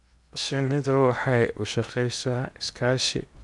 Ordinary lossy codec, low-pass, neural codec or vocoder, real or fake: none; 10.8 kHz; codec, 16 kHz in and 24 kHz out, 0.8 kbps, FocalCodec, streaming, 65536 codes; fake